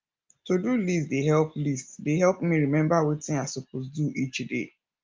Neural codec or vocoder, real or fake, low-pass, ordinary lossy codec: none; real; 7.2 kHz; Opus, 24 kbps